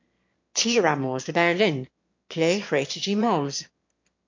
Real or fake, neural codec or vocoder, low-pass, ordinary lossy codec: fake; autoencoder, 22.05 kHz, a latent of 192 numbers a frame, VITS, trained on one speaker; 7.2 kHz; MP3, 48 kbps